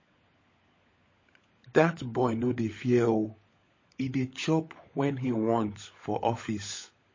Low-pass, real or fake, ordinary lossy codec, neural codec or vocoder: 7.2 kHz; fake; MP3, 32 kbps; codec, 16 kHz, 16 kbps, FunCodec, trained on LibriTTS, 50 frames a second